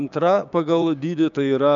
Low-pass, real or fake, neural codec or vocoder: 7.2 kHz; fake; codec, 16 kHz, 6 kbps, DAC